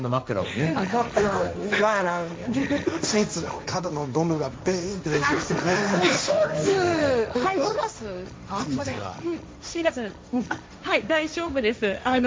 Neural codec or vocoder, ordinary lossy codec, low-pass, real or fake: codec, 16 kHz, 1.1 kbps, Voila-Tokenizer; none; none; fake